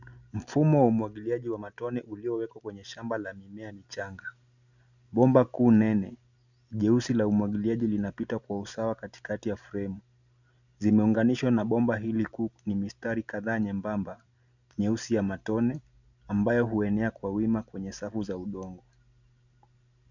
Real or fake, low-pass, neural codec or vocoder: real; 7.2 kHz; none